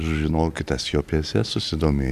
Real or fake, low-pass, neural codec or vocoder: real; 14.4 kHz; none